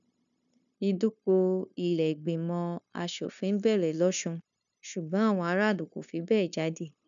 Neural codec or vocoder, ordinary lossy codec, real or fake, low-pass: codec, 16 kHz, 0.9 kbps, LongCat-Audio-Codec; none; fake; 7.2 kHz